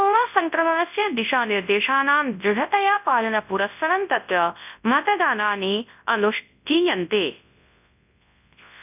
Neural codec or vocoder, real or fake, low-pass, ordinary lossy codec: codec, 24 kHz, 0.9 kbps, WavTokenizer, large speech release; fake; 3.6 kHz; none